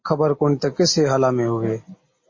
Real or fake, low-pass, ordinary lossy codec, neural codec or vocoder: real; 7.2 kHz; MP3, 32 kbps; none